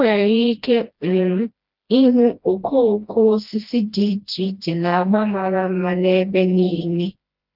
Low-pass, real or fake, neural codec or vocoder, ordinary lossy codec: 5.4 kHz; fake; codec, 16 kHz, 1 kbps, FreqCodec, smaller model; Opus, 24 kbps